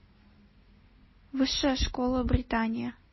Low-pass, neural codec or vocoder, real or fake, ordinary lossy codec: 7.2 kHz; none; real; MP3, 24 kbps